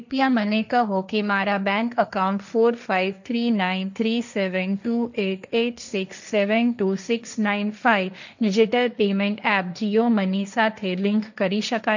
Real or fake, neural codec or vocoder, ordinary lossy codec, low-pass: fake; codec, 16 kHz, 1.1 kbps, Voila-Tokenizer; none; 7.2 kHz